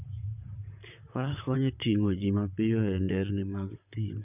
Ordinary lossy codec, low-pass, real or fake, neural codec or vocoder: none; 3.6 kHz; fake; vocoder, 44.1 kHz, 128 mel bands, Pupu-Vocoder